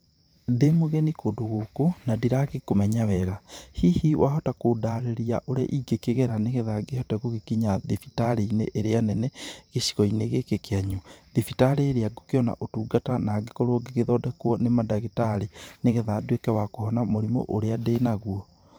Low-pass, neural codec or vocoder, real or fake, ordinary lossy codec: none; vocoder, 44.1 kHz, 128 mel bands every 512 samples, BigVGAN v2; fake; none